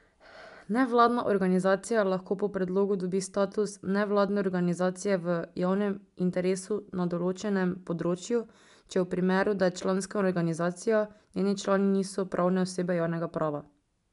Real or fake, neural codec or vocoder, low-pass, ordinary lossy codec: real; none; 10.8 kHz; none